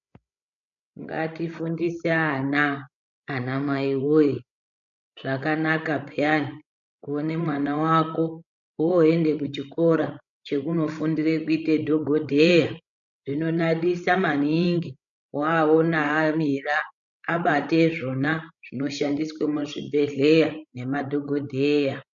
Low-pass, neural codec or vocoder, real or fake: 7.2 kHz; codec, 16 kHz, 16 kbps, FreqCodec, larger model; fake